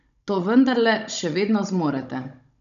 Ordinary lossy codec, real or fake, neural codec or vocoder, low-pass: none; fake; codec, 16 kHz, 16 kbps, FunCodec, trained on Chinese and English, 50 frames a second; 7.2 kHz